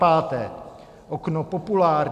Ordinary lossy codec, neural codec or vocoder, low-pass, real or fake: AAC, 96 kbps; none; 14.4 kHz; real